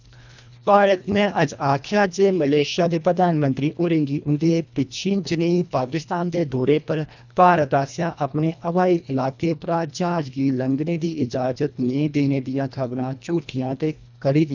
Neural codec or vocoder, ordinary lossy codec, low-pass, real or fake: codec, 24 kHz, 1.5 kbps, HILCodec; none; 7.2 kHz; fake